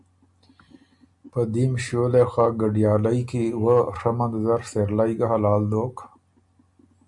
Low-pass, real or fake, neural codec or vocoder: 10.8 kHz; real; none